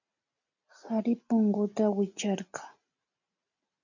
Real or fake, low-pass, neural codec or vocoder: real; 7.2 kHz; none